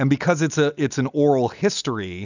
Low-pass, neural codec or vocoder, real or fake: 7.2 kHz; none; real